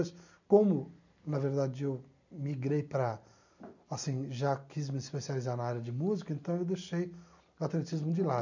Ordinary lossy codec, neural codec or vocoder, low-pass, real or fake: none; none; 7.2 kHz; real